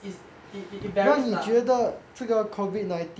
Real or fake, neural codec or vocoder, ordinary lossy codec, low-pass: real; none; none; none